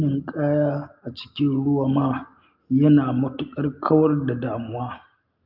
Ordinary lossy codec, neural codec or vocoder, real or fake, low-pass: Opus, 24 kbps; none; real; 5.4 kHz